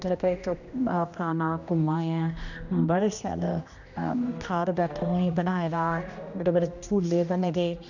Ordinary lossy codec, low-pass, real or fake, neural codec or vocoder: none; 7.2 kHz; fake; codec, 16 kHz, 1 kbps, X-Codec, HuBERT features, trained on general audio